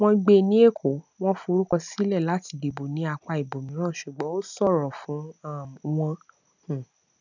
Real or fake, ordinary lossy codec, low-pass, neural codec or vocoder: real; none; 7.2 kHz; none